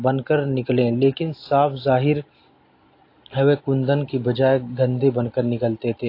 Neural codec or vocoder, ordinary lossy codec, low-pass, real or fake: none; AAC, 32 kbps; 5.4 kHz; real